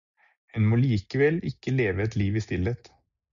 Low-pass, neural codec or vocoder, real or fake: 7.2 kHz; none; real